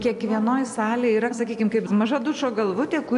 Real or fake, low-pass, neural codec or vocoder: real; 10.8 kHz; none